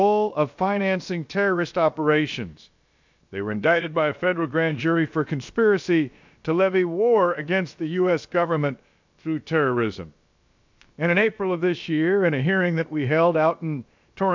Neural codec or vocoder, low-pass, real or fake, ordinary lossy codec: codec, 16 kHz, about 1 kbps, DyCAST, with the encoder's durations; 7.2 kHz; fake; MP3, 64 kbps